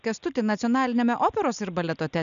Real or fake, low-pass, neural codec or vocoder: real; 7.2 kHz; none